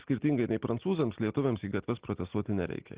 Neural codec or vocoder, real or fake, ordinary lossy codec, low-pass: none; real; Opus, 16 kbps; 3.6 kHz